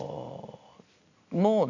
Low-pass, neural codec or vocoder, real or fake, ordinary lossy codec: 7.2 kHz; none; real; none